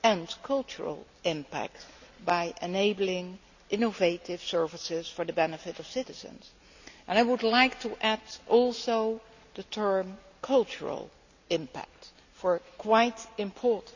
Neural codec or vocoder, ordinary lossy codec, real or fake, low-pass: none; none; real; 7.2 kHz